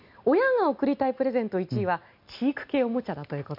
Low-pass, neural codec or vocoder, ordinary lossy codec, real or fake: 5.4 kHz; none; MP3, 48 kbps; real